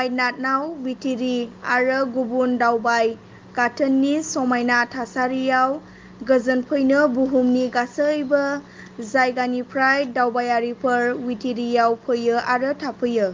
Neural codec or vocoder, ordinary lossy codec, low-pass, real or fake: none; Opus, 32 kbps; 7.2 kHz; real